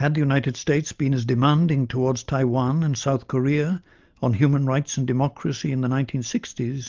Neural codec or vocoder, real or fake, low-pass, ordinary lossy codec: none; real; 7.2 kHz; Opus, 32 kbps